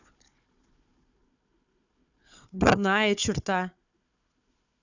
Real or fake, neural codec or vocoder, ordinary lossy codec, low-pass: fake; codec, 16 kHz, 4 kbps, FunCodec, trained on Chinese and English, 50 frames a second; none; 7.2 kHz